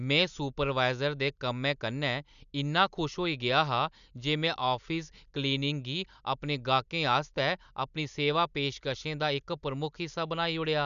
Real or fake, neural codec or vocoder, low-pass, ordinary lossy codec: real; none; 7.2 kHz; none